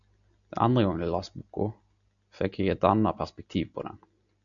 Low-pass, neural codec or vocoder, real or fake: 7.2 kHz; none; real